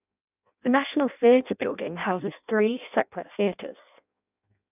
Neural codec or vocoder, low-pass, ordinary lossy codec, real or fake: codec, 16 kHz in and 24 kHz out, 0.6 kbps, FireRedTTS-2 codec; 3.6 kHz; none; fake